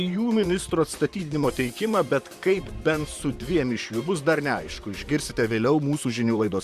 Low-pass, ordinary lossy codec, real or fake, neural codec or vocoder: 14.4 kHz; Opus, 64 kbps; fake; vocoder, 44.1 kHz, 128 mel bands, Pupu-Vocoder